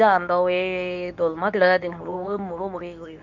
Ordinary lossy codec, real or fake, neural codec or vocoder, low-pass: none; fake; codec, 24 kHz, 0.9 kbps, WavTokenizer, medium speech release version 2; 7.2 kHz